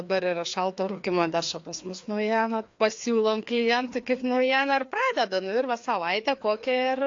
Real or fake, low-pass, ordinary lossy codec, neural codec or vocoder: fake; 7.2 kHz; AAC, 48 kbps; codec, 16 kHz, 2 kbps, FreqCodec, larger model